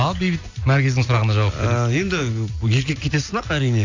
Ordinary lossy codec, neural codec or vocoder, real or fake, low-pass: AAC, 48 kbps; none; real; 7.2 kHz